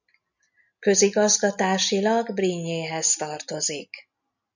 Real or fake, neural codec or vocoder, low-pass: real; none; 7.2 kHz